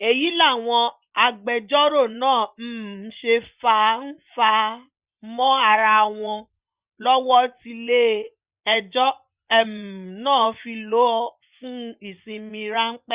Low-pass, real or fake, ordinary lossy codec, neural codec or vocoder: 3.6 kHz; fake; Opus, 64 kbps; vocoder, 24 kHz, 100 mel bands, Vocos